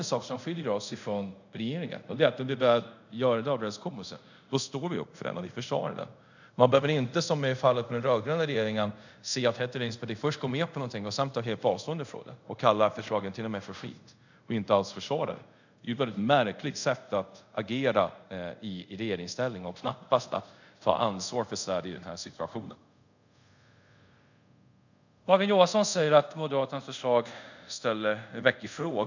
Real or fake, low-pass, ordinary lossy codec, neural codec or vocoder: fake; 7.2 kHz; none; codec, 24 kHz, 0.5 kbps, DualCodec